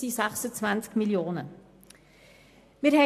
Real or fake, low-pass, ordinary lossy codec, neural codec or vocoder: real; 14.4 kHz; AAC, 64 kbps; none